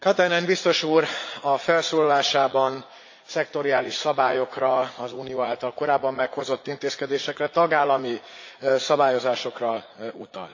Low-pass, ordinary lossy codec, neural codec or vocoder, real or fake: 7.2 kHz; AAC, 48 kbps; vocoder, 44.1 kHz, 80 mel bands, Vocos; fake